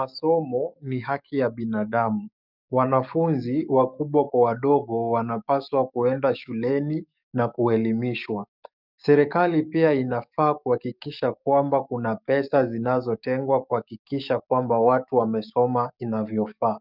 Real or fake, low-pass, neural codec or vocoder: fake; 5.4 kHz; codec, 44.1 kHz, 7.8 kbps, DAC